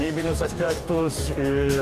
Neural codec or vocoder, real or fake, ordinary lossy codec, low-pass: codec, 44.1 kHz, 2.6 kbps, DAC; fake; MP3, 96 kbps; 14.4 kHz